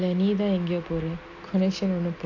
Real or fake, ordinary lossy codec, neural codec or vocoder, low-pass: real; AAC, 48 kbps; none; 7.2 kHz